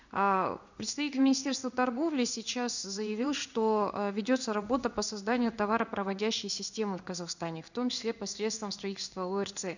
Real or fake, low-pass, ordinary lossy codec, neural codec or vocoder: fake; 7.2 kHz; none; codec, 16 kHz in and 24 kHz out, 1 kbps, XY-Tokenizer